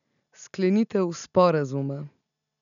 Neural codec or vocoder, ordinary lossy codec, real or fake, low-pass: none; none; real; 7.2 kHz